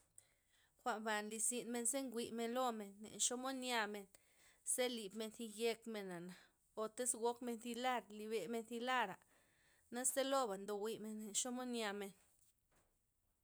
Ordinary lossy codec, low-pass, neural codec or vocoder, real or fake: none; none; none; real